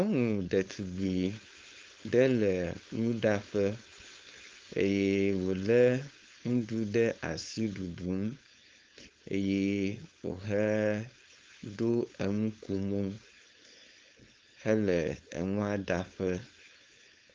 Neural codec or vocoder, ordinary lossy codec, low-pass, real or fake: codec, 16 kHz, 4.8 kbps, FACodec; Opus, 32 kbps; 7.2 kHz; fake